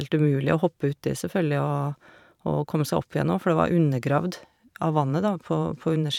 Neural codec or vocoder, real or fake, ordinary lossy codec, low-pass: vocoder, 44.1 kHz, 128 mel bands every 512 samples, BigVGAN v2; fake; none; 19.8 kHz